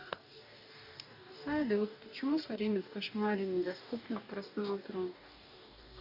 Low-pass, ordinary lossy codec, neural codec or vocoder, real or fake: 5.4 kHz; none; codec, 44.1 kHz, 2.6 kbps, DAC; fake